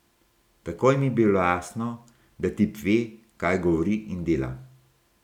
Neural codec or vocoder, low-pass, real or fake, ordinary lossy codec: none; 19.8 kHz; real; none